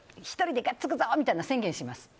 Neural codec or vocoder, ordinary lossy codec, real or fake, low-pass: none; none; real; none